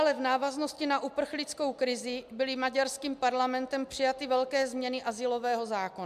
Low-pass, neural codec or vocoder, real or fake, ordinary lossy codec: 14.4 kHz; none; real; MP3, 96 kbps